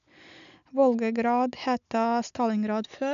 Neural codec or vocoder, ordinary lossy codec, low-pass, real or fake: none; none; 7.2 kHz; real